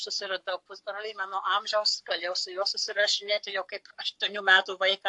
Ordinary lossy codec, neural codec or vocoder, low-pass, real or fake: AAC, 64 kbps; codec, 44.1 kHz, 7.8 kbps, Pupu-Codec; 10.8 kHz; fake